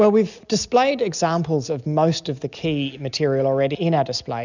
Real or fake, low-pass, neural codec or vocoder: real; 7.2 kHz; none